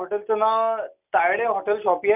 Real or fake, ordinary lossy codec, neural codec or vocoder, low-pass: real; none; none; 3.6 kHz